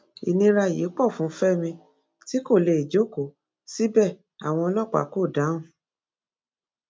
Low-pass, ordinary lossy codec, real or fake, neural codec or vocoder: none; none; real; none